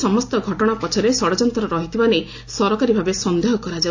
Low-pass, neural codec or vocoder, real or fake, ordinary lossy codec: 7.2 kHz; none; real; AAC, 48 kbps